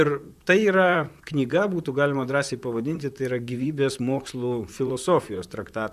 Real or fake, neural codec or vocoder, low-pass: fake; vocoder, 44.1 kHz, 128 mel bands, Pupu-Vocoder; 14.4 kHz